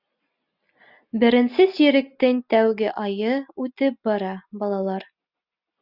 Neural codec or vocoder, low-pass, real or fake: none; 5.4 kHz; real